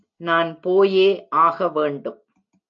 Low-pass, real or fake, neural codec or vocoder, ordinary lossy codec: 7.2 kHz; real; none; AAC, 48 kbps